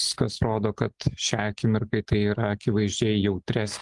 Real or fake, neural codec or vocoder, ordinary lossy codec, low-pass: real; none; Opus, 32 kbps; 10.8 kHz